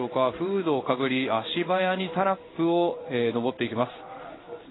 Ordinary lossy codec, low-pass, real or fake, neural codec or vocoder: AAC, 16 kbps; 7.2 kHz; fake; codec, 16 kHz in and 24 kHz out, 1 kbps, XY-Tokenizer